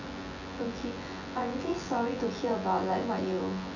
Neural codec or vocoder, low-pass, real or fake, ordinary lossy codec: vocoder, 24 kHz, 100 mel bands, Vocos; 7.2 kHz; fake; none